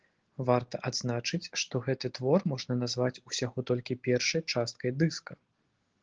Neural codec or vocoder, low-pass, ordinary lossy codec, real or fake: none; 7.2 kHz; Opus, 16 kbps; real